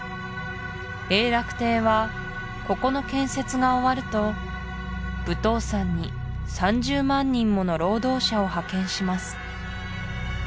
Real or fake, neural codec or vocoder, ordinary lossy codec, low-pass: real; none; none; none